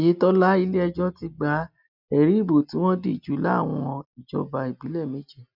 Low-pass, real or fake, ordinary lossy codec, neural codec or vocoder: 5.4 kHz; real; none; none